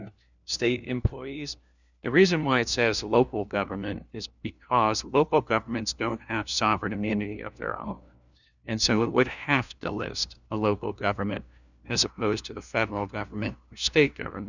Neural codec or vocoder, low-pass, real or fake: codec, 16 kHz, 1 kbps, FunCodec, trained on LibriTTS, 50 frames a second; 7.2 kHz; fake